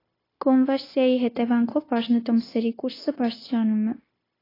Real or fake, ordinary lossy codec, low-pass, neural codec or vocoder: fake; AAC, 24 kbps; 5.4 kHz; codec, 16 kHz, 0.9 kbps, LongCat-Audio-Codec